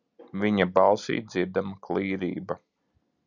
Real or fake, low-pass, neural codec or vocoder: real; 7.2 kHz; none